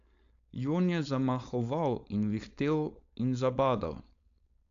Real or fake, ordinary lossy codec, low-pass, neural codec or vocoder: fake; AAC, 64 kbps; 7.2 kHz; codec, 16 kHz, 4.8 kbps, FACodec